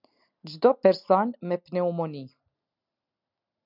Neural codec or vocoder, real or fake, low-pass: none; real; 5.4 kHz